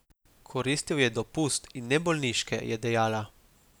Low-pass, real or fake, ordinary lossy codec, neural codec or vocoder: none; real; none; none